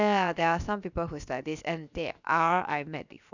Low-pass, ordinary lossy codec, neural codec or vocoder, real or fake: 7.2 kHz; none; codec, 16 kHz, 0.7 kbps, FocalCodec; fake